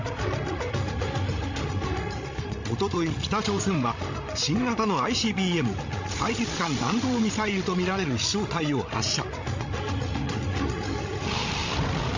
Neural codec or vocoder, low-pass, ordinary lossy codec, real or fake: codec, 16 kHz, 16 kbps, FreqCodec, larger model; 7.2 kHz; MP3, 48 kbps; fake